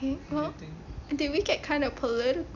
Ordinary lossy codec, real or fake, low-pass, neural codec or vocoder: none; real; 7.2 kHz; none